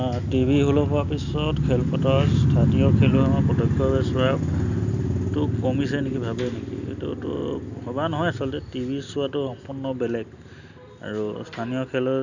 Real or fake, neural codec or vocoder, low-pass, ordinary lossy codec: real; none; 7.2 kHz; none